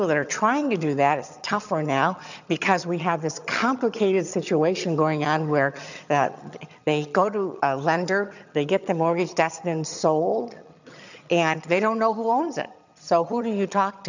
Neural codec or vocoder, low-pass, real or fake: vocoder, 22.05 kHz, 80 mel bands, HiFi-GAN; 7.2 kHz; fake